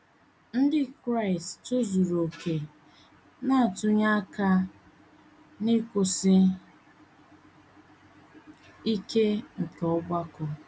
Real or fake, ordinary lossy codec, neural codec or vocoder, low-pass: real; none; none; none